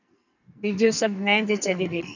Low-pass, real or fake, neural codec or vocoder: 7.2 kHz; fake; codec, 44.1 kHz, 2.6 kbps, SNAC